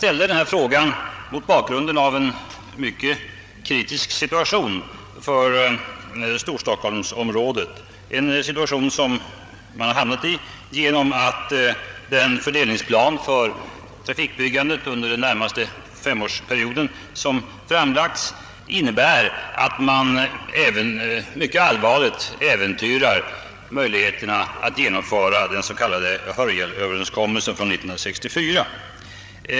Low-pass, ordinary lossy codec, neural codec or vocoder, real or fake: none; none; codec, 16 kHz, 8 kbps, FreqCodec, larger model; fake